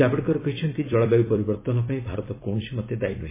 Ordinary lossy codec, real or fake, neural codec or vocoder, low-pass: MP3, 16 kbps; fake; vocoder, 44.1 kHz, 128 mel bands every 256 samples, BigVGAN v2; 3.6 kHz